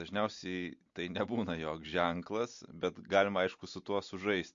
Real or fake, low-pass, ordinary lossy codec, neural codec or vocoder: real; 7.2 kHz; MP3, 48 kbps; none